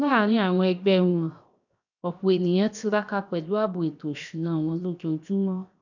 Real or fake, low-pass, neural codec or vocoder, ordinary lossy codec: fake; 7.2 kHz; codec, 16 kHz, 0.7 kbps, FocalCodec; none